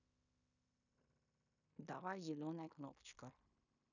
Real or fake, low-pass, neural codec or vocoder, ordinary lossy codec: fake; 7.2 kHz; codec, 16 kHz in and 24 kHz out, 0.9 kbps, LongCat-Audio-Codec, fine tuned four codebook decoder; none